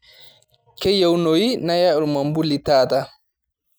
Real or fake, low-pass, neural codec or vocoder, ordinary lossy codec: real; none; none; none